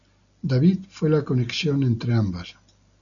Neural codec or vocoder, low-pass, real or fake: none; 7.2 kHz; real